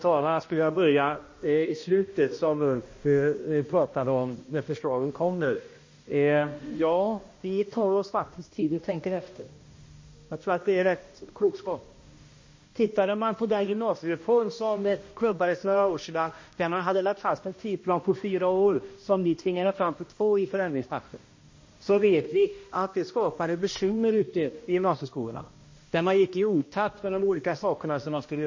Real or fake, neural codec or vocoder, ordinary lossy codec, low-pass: fake; codec, 16 kHz, 1 kbps, X-Codec, HuBERT features, trained on balanced general audio; MP3, 32 kbps; 7.2 kHz